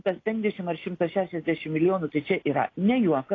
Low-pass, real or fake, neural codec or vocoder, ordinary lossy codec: 7.2 kHz; real; none; AAC, 32 kbps